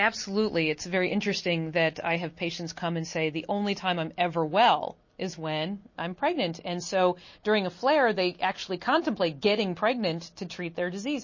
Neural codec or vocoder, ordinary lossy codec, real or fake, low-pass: none; MP3, 32 kbps; real; 7.2 kHz